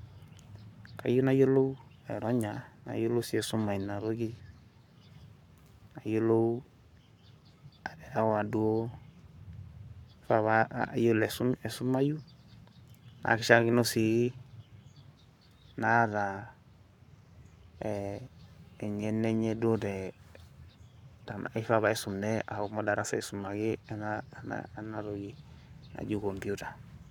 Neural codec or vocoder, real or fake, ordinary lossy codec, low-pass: codec, 44.1 kHz, 7.8 kbps, Pupu-Codec; fake; none; 19.8 kHz